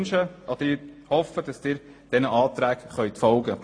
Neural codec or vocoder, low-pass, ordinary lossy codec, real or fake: none; 9.9 kHz; AAC, 48 kbps; real